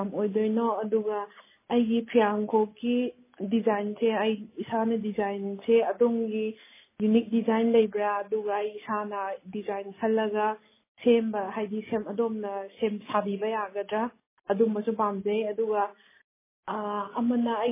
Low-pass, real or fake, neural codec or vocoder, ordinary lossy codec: 3.6 kHz; real; none; MP3, 16 kbps